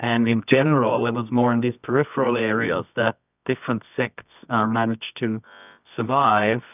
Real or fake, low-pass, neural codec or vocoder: fake; 3.6 kHz; codec, 24 kHz, 0.9 kbps, WavTokenizer, medium music audio release